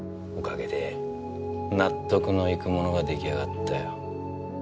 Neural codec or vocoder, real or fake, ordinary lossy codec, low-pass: none; real; none; none